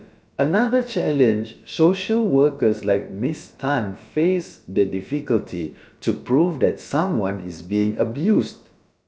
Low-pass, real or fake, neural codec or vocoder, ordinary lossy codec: none; fake; codec, 16 kHz, about 1 kbps, DyCAST, with the encoder's durations; none